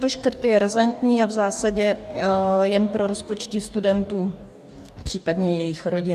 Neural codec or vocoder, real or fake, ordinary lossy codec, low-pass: codec, 44.1 kHz, 2.6 kbps, DAC; fake; AAC, 96 kbps; 14.4 kHz